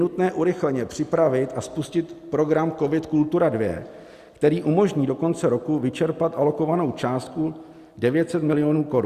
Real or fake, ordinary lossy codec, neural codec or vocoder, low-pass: real; Opus, 64 kbps; none; 14.4 kHz